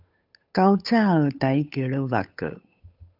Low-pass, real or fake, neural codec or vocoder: 5.4 kHz; fake; codec, 16 kHz, 8 kbps, FunCodec, trained on Chinese and English, 25 frames a second